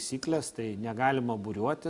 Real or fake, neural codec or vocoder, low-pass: real; none; 10.8 kHz